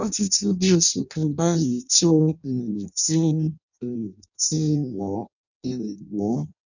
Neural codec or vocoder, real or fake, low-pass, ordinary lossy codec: codec, 16 kHz in and 24 kHz out, 0.6 kbps, FireRedTTS-2 codec; fake; 7.2 kHz; none